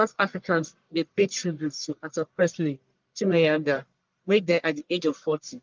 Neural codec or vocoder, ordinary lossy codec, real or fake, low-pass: codec, 44.1 kHz, 1.7 kbps, Pupu-Codec; Opus, 32 kbps; fake; 7.2 kHz